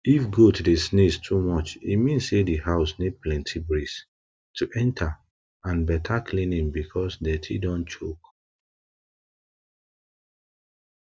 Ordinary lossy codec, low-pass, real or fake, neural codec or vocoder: none; none; real; none